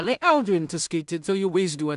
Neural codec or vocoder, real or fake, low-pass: codec, 16 kHz in and 24 kHz out, 0.4 kbps, LongCat-Audio-Codec, two codebook decoder; fake; 10.8 kHz